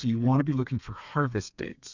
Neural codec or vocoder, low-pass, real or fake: codec, 32 kHz, 1.9 kbps, SNAC; 7.2 kHz; fake